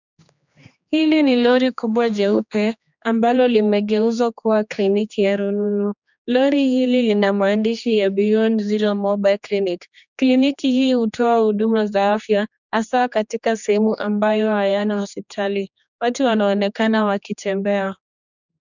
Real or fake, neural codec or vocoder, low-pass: fake; codec, 16 kHz, 2 kbps, X-Codec, HuBERT features, trained on general audio; 7.2 kHz